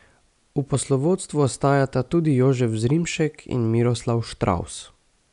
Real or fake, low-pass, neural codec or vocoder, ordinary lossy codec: real; 10.8 kHz; none; none